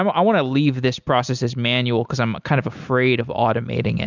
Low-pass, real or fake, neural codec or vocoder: 7.2 kHz; real; none